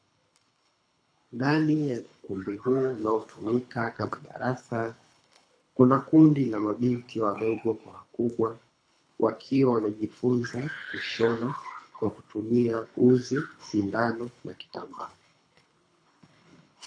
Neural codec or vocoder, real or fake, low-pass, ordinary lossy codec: codec, 24 kHz, 3 kbps, HILCodec; fake; 9.9 kHz; AAC, 48 kbps